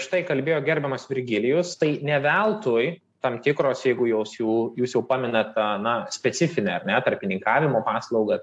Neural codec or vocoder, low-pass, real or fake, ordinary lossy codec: none; 10.8 kHz; real; AAC, 64 kbps